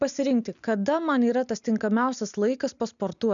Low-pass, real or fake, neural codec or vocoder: 7.2 kHz; real; none